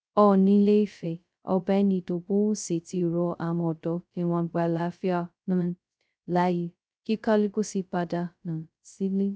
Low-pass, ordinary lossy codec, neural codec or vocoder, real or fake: none; none; codec, 16 kHz, 0.2 kbps, FocalCodec; fake